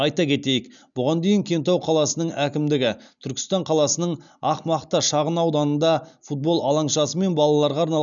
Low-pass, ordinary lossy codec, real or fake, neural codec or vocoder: 7.2 kHz; none; real; none